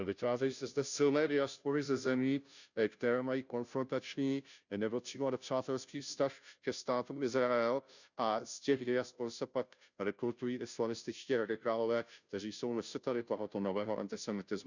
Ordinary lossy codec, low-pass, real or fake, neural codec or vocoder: none; 7.2 kHz; fake; codec, 16 kHz, 0.5 kbps, FunCodec, trained on Chinese and English, 25 frames a second